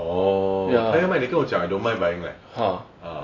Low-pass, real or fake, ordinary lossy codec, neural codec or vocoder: 7.2 kHz; real; AAC, 32 kbps; none